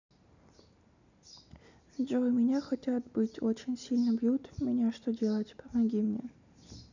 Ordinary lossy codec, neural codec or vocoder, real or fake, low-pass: none; none; real; 7.2 kHz